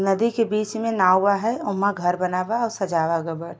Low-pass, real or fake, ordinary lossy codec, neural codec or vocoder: none; real; none; none